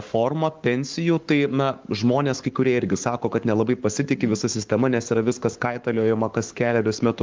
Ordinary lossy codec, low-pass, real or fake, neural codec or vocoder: Opus, 32 kbps; 7.2 kHz; fake; codec, 44.1 kHz, 7.8 kbps, Pupu-Codec